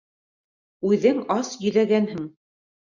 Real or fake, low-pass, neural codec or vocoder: real; 7.2 kHz; none